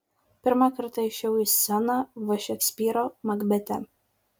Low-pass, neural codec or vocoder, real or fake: 19.8 kHz; none; real